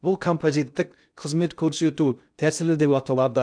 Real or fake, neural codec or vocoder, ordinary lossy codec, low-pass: fake; codec, 16 kHz in and 24 kHz out, 0.6 kbps, FocalCodec, streaming, 2048 codes; none; 9.9 kHz